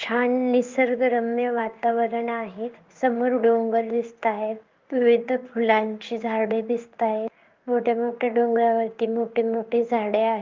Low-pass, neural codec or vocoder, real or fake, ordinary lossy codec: 7.2 kHz; codec, 16 kHz in and 24 kHz out, 1 kbps, XY-Tokenizer; fake; Opus, 32 kbps